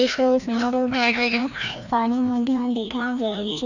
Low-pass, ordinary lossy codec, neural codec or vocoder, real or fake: 7.2 kHz; none; codec, 16 kHz, 1 kbps, FreqCodec, larger model; fake